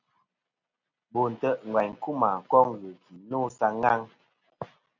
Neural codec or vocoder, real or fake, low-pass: none; real; 7.2 kHz